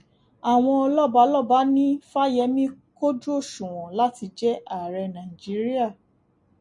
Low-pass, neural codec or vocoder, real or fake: 10.8 kHz; none; real